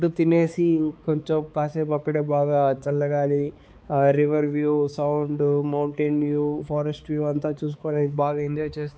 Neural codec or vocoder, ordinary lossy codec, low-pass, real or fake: codec, 16 kHz, 4 kbps, X-Codec, HuBERT features, trained on balanced general audio; none; none; fake